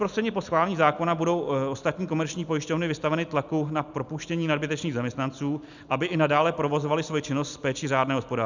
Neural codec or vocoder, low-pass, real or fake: none; 7.2 kHz; real